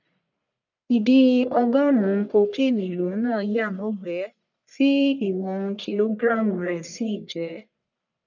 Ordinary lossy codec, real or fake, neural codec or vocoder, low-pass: none; fake; codec, 44.1 kHz, 1.7 kbps, Pupu-Codec; 7.2 kHz